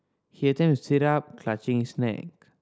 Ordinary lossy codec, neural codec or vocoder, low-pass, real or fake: none; none; none; real